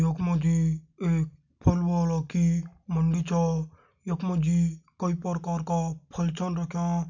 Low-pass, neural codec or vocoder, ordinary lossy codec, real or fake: 7.2 kHz; none; none; real